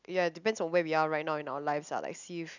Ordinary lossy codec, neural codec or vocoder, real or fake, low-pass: none; none; real; 7.2 kHz